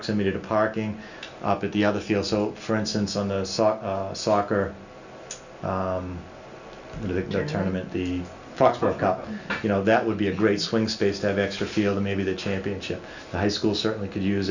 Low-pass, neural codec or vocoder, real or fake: 7.2 kHz; none; real